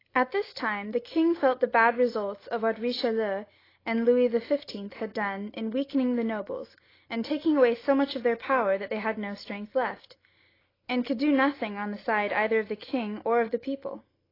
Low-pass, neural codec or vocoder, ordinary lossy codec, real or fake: 5.4 kHz; none; AAC, 24 kbps; real